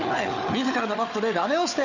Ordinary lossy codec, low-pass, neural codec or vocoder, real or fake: none; 7.2 kHz; codec, 16 kHz, 4 kbps, FunCodec, trained on Chinese and English, 50 frames a second; fake